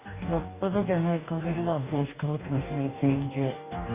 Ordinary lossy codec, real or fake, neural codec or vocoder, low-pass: Opus, 64 kbps; fake; codec, 44.1 kHz, 2.6 kbps, DAC; 3.6 kHz